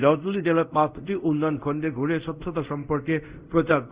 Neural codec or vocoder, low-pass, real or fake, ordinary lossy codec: codec, 24 kHz, 0.5 kbps, DualCodec; 3.6 kHz; fake; Opus, 64 kbps